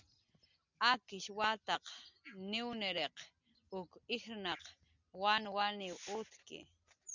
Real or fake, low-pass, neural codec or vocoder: real; 7.2 kHz; none